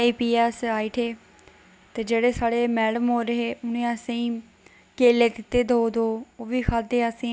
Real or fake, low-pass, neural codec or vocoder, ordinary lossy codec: real; none; none; none